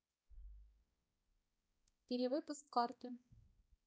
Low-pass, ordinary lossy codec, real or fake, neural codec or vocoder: none; none; fake; codec, 16 kHz, 1 kbps, X-Codec, HuBERT features, trained on balanced general audio